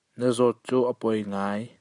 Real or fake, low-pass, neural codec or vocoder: real; 10.8 kHz; none